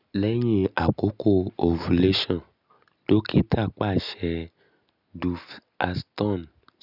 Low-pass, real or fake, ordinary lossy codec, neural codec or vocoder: 5.4 kHz; real; none; none